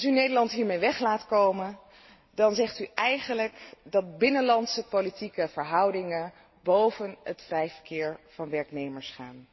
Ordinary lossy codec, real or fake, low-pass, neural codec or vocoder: MP3, 24 kbps; real; 7.2 kHz; none